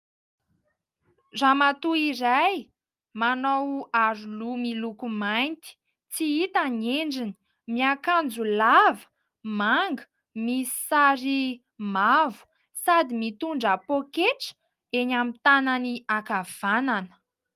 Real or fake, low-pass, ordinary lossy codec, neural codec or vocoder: real; 14.4 kHz; Opus, 32 kbps; none